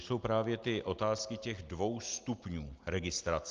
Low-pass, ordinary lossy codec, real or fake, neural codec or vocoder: 7.2 kHz; Opus, 32 kbps; real; none